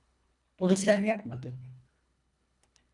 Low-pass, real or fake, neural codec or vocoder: 10.8 kHz; fake; codec, 24 kHz, 1.5 kbps, HILCodec